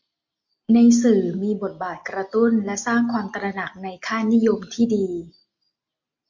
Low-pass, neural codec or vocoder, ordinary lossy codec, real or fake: 7.2 kHz; none; MP3, 48 kbps; real